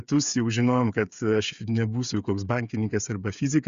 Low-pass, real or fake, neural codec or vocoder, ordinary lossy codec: 7.2 kHz; fake; codec, 16 kHz, 8 kbps, FreqCodec, smaller model; Opus, 64 kbps